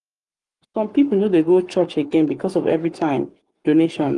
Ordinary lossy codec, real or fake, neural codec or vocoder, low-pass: Opus, 32 kbps; fake; codec, 44.1 kHz, 7.8 kbps, Pupu-Codec; 10.8 kHz